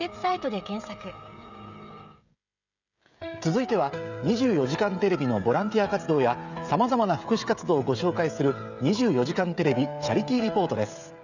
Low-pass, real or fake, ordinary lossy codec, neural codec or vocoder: 7.2 kHz; fake; none; codec, 16 kHz, 16 kbps, FreqCodec, smaller model